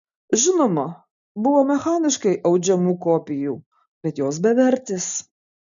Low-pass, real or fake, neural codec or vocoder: 7.2 kHz; real; none